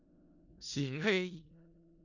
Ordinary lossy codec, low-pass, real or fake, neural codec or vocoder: Opus, 64 kbps; 7.2 kHz; fake; codec, 16 kHz in and 24 kHz out, 0.4 kbps, LongCat-Audio-Codec, four codebook decoder